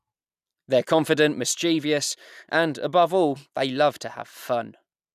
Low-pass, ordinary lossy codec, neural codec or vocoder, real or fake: 14.4 kHz; none; none; real